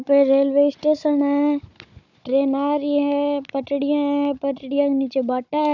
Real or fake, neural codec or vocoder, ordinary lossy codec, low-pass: fake; codec, 16 kHz, 16 kbps, FunCodec, trained on Chinese and English, 50 frames a second; none; 7.2 kHz